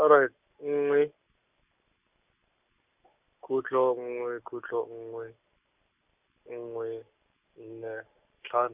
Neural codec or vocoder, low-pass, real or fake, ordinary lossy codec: none; 3.6 kHz; real; none